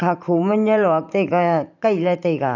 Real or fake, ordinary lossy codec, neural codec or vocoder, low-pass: fake; none; vocoder, 44.1 kHz, 128 mel bands every 512 samples, BigVGAN v2; 7.2 kHz